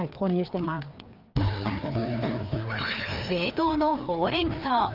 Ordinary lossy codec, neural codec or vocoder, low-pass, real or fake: Opus, 32 kbps; codec, 16 kHz, 2 kbps, FreqCodec, larger model; 5.4 kHz; fake